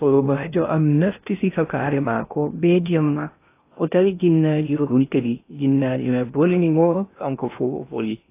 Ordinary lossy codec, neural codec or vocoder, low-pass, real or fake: AAC, 24 kbps; codec, 16 kHz in and 24 kHz out, 0.6 kbps, FocalCodec, streaming, 2048 codes; 3.6 kHz; fake